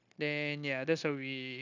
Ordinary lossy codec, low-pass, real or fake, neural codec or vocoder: none; 7.2 kHz; fake; codec, 16 kHz, 0.9 kbps, LongCat-Audio-Codec